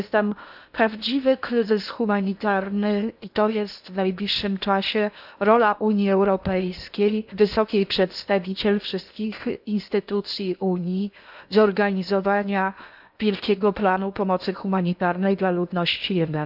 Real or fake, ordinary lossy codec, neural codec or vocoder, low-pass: fake; none; codec, 16 kHz in and 24 kHz out, 0.8 kbps, FocalCodec, streaming, 65536 codes; 5.4 kHz